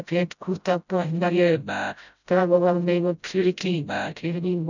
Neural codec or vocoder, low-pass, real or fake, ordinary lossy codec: codec, 16 kHz, 0.5 kbps, FreqCodec, smaller model; 7.2 kHz; fake; none